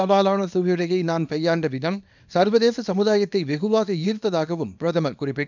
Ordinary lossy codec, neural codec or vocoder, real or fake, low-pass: none; codec, 24 kHz, 0.9 kbps, WavTokenizer, small release; fake; 7.2 kHz